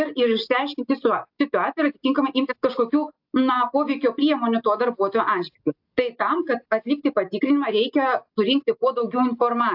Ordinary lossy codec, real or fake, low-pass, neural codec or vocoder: AAC, 48 kbps; real; 5.4 kHz; none